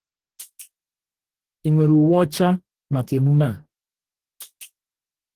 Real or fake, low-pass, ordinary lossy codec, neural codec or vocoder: fake; 14.4 kHz; Opus, 16 kbps; codec, 44.1 kHz, 2.6 kbps, DAC